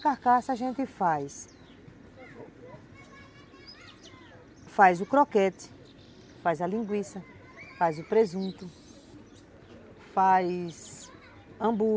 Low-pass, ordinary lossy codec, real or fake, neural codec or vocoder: none; none; real; none